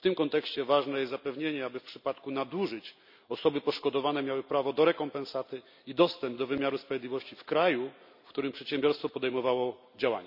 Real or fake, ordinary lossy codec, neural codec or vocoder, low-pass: real; none; none; 5.4 kHz